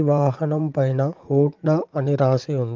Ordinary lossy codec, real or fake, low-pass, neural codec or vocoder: Opus, 32 kbps; fake; 7.2 kHz; vocoder, 44.1 kHz, 80 mel bands, Vocos